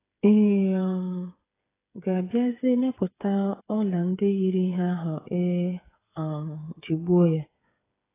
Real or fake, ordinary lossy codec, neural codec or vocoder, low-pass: fake; AAC, 16 kbps; codec, 16 kHz, 8 kbps, FreqCodec, smaller model; 3.6 kHz